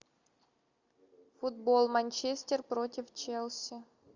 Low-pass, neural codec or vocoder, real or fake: 7.2 kHz; none; real